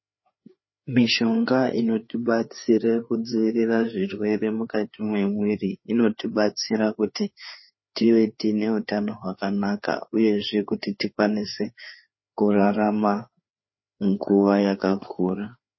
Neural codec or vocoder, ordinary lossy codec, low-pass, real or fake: codec, 16 kHz, 4 kbps, FreqCodec, larger model; MP3, 24 kbps; 7.2 kHz; fake